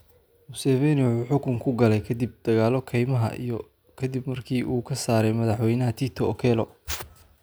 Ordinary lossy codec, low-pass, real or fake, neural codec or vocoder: none; none; real; none